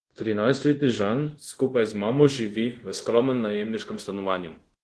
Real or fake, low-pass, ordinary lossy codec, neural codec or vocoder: fake; 10.8 kHz; Opus, 16 kbps; codec, 24 kHz, 0.5 kbps, DualCodec